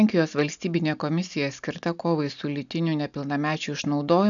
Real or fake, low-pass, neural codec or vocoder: real; 7.2 kHz; none